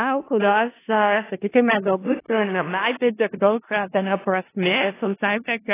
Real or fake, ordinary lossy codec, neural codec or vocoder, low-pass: fake; AAC, 16 kbps; codec, 16 kHz in and 24 kHz out, 0.4 kbps, LongCat-Audio-Codec, four codebook decoder; 3.6 kHz